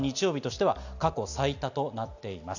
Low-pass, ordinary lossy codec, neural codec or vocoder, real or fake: 7.2 kHz; none; none; real